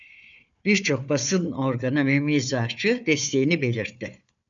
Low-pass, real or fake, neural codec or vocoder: 7.2 kHz; fake; codec, 16 kHz, 4 kbps, FunCodec, trained on Chinese and English, 50 frames a second